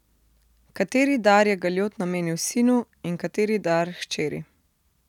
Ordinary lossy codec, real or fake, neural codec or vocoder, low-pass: none; real; none; 19.8 kHz